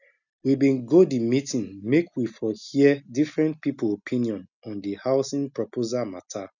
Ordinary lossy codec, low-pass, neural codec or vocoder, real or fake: none; 7.2 kHz; none; real